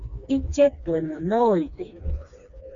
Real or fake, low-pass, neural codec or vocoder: fake; 7.2 kHz; codec, 16 kHz, 2 kbps, FreqCodec, smaller model